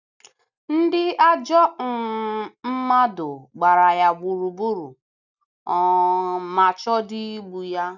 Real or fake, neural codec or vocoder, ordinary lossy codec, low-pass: real; none; none; 7.2 kHz